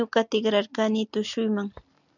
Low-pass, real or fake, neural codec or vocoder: 7.2 kHz; real; none